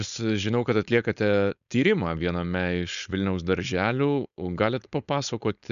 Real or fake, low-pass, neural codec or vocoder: fake; 7.2 kHz; codec, 16 kHz, 4.8 kbps, FACodec